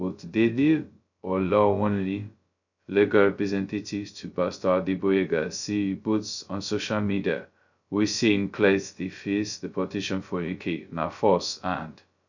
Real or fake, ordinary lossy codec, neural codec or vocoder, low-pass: fake; none; codec, 16 kHz, 0.2 kbps, FocalCodec; 7.2 kHz